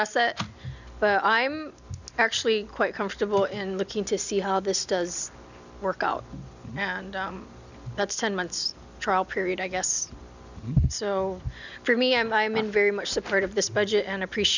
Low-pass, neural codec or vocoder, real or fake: 7.2 kHz; none; real